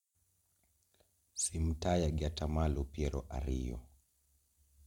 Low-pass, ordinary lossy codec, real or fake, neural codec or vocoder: 19.8 kHz; MP3, 96 kbps; real; none